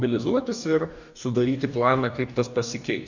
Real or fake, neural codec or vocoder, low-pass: fake; codec, 44.1 kHz, 2.6 kbps, DAC; 7.2 kHz